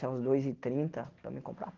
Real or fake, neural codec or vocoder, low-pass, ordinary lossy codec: real; none; 7.2 kHz; Opus, 16 kbps